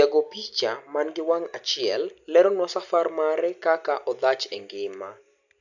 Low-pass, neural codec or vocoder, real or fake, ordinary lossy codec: 7.2 kHz; none; real; none